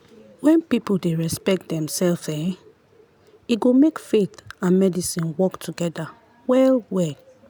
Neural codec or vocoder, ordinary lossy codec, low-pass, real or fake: none; none; none; real